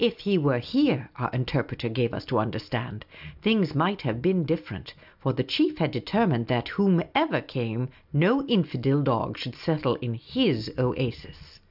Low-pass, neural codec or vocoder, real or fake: 5.4 kHz; none; real